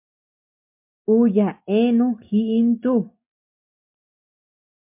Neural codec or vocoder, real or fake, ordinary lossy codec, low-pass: none; real; MP3, 32 kbps; 3.6 kHz